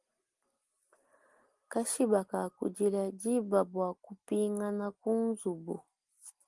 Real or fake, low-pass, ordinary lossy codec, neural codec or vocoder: real; 10.8 kHz; Opus, 24 kbps; none